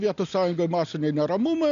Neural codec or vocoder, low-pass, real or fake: none; 7.2 kHz; real